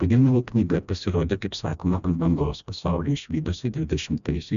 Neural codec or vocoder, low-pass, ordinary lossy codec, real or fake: codec, 16 kHz, 1 kbps, FreqCodec, smaller model; 7.2 kHz; MP3, 96 kbps; fake